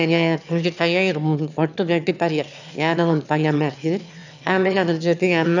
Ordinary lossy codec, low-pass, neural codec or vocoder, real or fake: none; 7.2 kHz; autoencoder, 22.05 kHz, a latent of 192 numbers a frame, VITS, trained on one speaker; fake